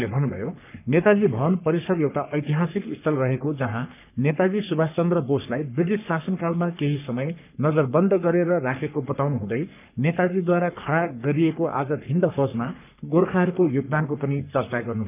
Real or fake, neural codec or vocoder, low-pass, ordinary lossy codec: fake; codec, 44.1 kHz, 3.4 kbps, Pupu-Codec; 3.6 kHz; none